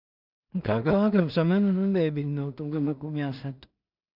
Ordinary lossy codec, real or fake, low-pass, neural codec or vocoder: none; fake; 5.4 kHz; codec, 16 kHz in and 24 kHz out, 0.4 kbps, LongCat-Audio-Codec, two codebook decoder